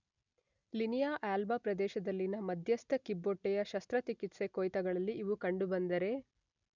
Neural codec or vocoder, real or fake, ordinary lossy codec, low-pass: none; real; none; 7.2 kHz